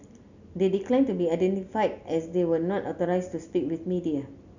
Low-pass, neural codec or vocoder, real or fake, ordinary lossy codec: 7.2 kHz; none; real; none